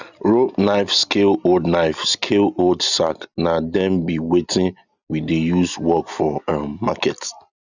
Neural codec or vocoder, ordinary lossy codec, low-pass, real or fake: none; none; 7.2 kHz; real